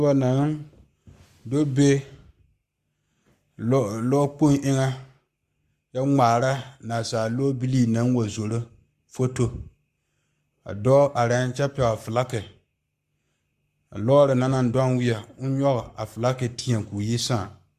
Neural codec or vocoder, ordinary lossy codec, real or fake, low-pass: codec, 44.1 kHz, 7.8 kbps, Pupu-Codec; Opus, 64 kbps; fake; 14.4 kHz